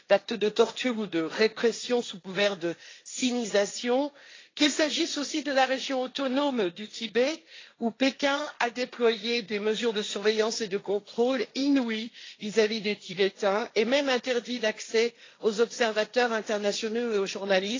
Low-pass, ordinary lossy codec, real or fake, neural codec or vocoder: 7.2 kHz; AAC, 32 kbps; fake; codec, 16 kHz, 1.1 kbps, Voila-Tokenizer